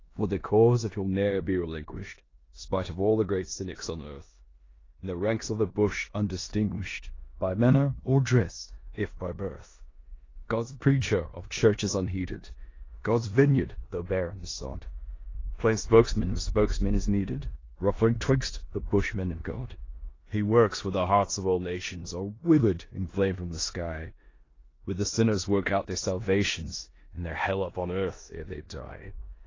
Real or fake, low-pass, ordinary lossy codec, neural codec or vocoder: fake; 7.2 kHz; AAC, 32 kbps; codec, 16 kHz in and 24 kHz out, 0.9 kbps, LongCat-Audio-Codec, four codebook decoder